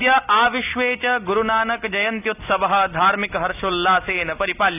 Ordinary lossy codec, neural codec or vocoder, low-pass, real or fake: none; none; 3.6 kHz; real